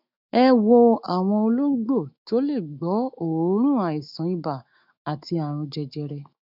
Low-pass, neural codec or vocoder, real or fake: 5.4 kHz; autoencoder, 48 kHz, 128 numbers a frame, DAC-VAE, trained on Japanese speech; fake